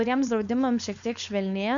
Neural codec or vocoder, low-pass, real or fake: codec, 16 kHz, 4.8 kbps, FACodec; 7.2 kHz; fake